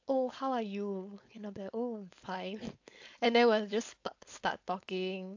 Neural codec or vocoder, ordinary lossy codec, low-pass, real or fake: codec, 16 kHz, 4.8 kbps, FACodec; AAC, 48 kbps; 7.2 kHz; fake